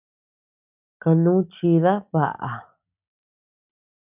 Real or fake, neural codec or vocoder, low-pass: real; none; 3.6 kHz